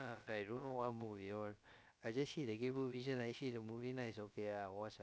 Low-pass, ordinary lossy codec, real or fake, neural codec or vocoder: none; none; fake; codec, 16 kHz, about 1 kbps, DyCAST, with the encoder's durations